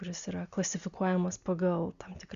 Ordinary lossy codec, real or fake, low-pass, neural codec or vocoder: Opus, 64 kbps; real; 7.2 kHz; none